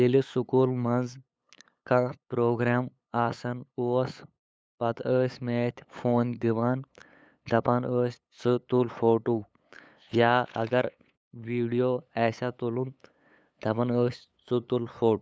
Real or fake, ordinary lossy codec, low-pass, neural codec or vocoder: fake; none; none; codec, 16 kHz, 8 kbps, FunCodec, trained on LibriTTS, 25 frames a second